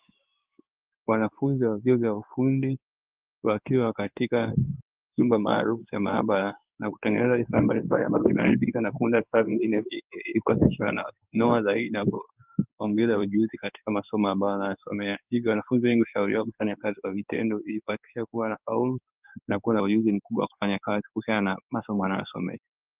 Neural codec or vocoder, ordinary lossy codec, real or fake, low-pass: codec, 16 kHz in and 24 kHz out, 1 kbps, XY-Tokenizer; Opus, 24 kbps; fake; 3.6 kHz